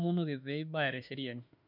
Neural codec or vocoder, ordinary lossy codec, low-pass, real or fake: autoencoder, 48 kHz, 32 numbers a frame, DAC-VAE, trained on Japanese speech; none; 5.4 kHz; fake